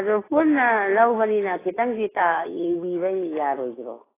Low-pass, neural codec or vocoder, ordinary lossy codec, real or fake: 3.6 kHz; autoencoder, 48 kHz, 128 numbers a frame, DAC-VAE, trained on Japanese speech; AAC, 16 kbps; fake